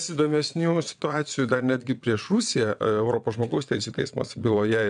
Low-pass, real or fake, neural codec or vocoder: 9.9 kHz; fake; vocoder, 22.05 kHz, 80 mel bands, WaveNeXt